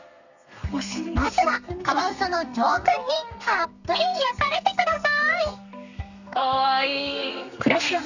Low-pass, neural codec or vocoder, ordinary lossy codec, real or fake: 7.2 kHz; codec, 32 kHz, 1.9 kbps, SNAC; none; fake